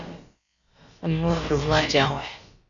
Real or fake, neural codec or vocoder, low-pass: fake; codec, 16 kHz, about 1 kbps, DyCAST, with the encoder's durations; 7.2 kHz